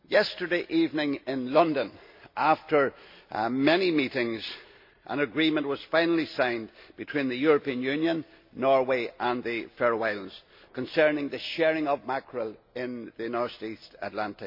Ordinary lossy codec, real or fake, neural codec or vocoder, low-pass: MP3, 32 kbps; real; none; 5.4 kHz